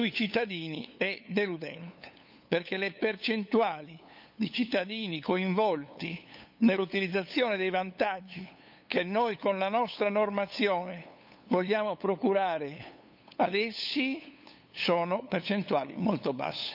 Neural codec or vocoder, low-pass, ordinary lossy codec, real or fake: codec, 16 kHz, 8 kbps, FunCodec, trained on LibriTTS, 25 frames a second; 5.4 kHz; none; fake